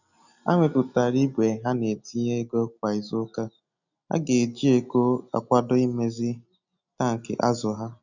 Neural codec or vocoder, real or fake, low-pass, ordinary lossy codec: none; real; 7.2 kHz; none